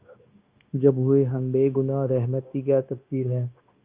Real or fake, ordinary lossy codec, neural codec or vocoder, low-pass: fake; Opus, 24 kbps; codec, 16 kHz, 0.9 kbps, LongCat-Audio-Codec; 3.6 kHz